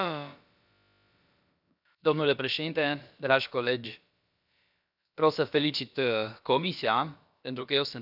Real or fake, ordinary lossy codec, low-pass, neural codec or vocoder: fake; none; 5.4 kHz; codec, 16 kHz, about 1 kbps, DyCAST, with the encoder's durations